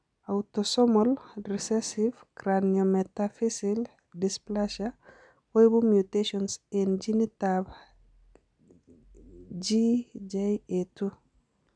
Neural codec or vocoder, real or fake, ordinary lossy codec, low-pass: none; real; none; 9.9 kHz